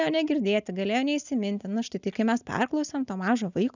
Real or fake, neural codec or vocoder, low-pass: real; none; 7.2 kHz